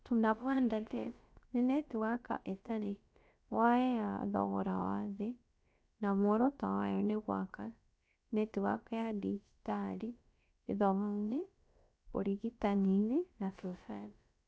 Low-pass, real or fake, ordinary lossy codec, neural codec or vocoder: none; fake; none; codec, 16 kHz, about 1 kbps, DyCAST, with the encoder's durations